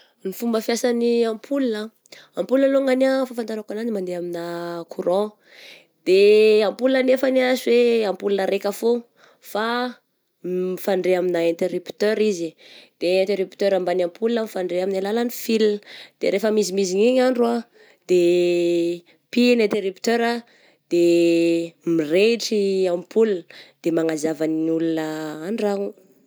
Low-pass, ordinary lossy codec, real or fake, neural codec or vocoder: none; none; real; none